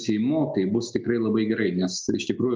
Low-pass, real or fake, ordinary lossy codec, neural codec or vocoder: 7.2 kHz; real; Opus, 24 kbps; none